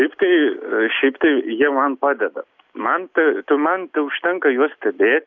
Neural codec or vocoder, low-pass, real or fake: none; 7.2 kHz; real